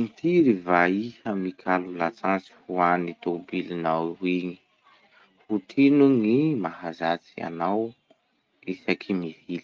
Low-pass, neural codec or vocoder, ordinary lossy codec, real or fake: 7.2 kHz; none; Opus, 24 kbps; real